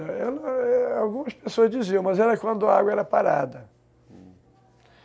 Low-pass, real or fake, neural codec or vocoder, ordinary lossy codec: none; real; none; none